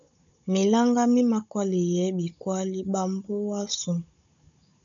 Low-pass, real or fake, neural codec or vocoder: 7.2 kHz; fake; codec, 16 kHz, 16 kbps, FunCodec, trained on Chinese and English, 50 frames a second